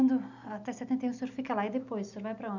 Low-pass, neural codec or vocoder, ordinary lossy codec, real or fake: 7.2 kHz; none; none; real